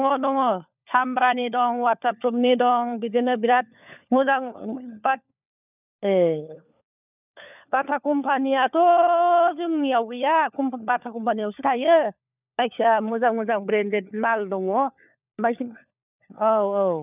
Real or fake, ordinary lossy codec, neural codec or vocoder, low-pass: fake; none; codec, 16 kHz, 4 kbps, FunCodec, trained on LibriTTS, 50 frames a second; 3.6 kHz